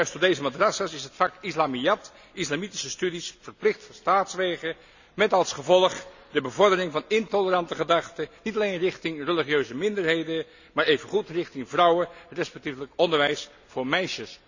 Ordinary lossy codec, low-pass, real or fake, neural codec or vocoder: none; 7.2 kHz; real; none